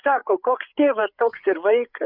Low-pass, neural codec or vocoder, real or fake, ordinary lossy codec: 5.4 kHz; codec, 16 kHz, 8 kbps, FreqCodec, larger model; fake; Opus, 64 kbps